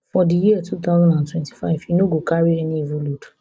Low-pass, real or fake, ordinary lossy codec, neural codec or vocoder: none; real; none; none